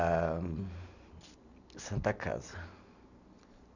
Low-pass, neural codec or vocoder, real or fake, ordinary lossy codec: 7.2 kHz; none; real; none